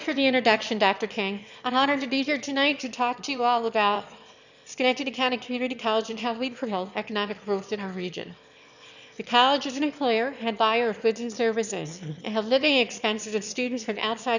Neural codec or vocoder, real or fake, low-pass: autoencoder, 22.05 kHz, a latent of 192 numbers a frame, VITS, trained on one speaker; fake; 7.2 kHz